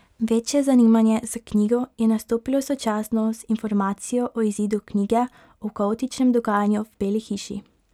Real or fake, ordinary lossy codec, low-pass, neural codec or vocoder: real; none; 19.8 kHz; none